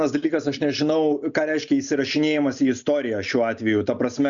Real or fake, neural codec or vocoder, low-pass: real; none; 7.2 kHz